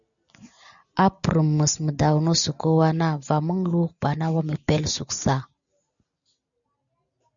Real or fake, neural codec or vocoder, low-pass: real; none; 7.2 kHz